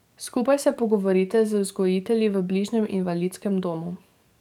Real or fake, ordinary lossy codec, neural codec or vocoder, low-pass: fake; none; codec, 44.1 kHz, 7.8 kbps, DAC; 19.8 kHz